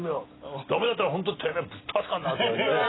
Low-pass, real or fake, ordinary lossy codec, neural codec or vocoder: 7.2 kHz; real; AAC, 16 kbps; none